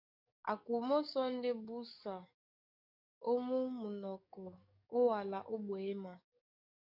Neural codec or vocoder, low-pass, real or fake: codec, 44.1 kHz, 7.8 kbps, DAC; 5.4 kHz; fake